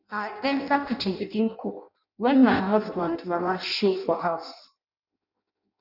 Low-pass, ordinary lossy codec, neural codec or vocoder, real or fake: 5.4 kHz; none; codec, 16 kHz in and 24 kHz out, 0.6 kbps, FireRedTTS-2 codec; fake